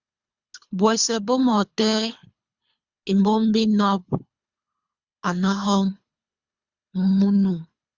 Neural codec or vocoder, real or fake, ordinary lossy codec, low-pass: codec, 24 kHz, 3 kbps, HILCodec; fake; Opus, 64 kbps; 7.2 kHz